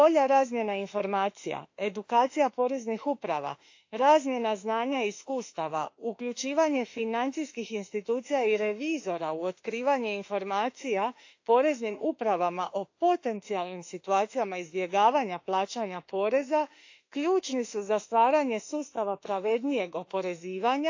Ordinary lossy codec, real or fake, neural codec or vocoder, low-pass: AAC, 48 kbps; fake; autoencoder, 48 kHz, 32 numbers a frame, DAC-VAE, trained on Japanese speech; 7.2 kHz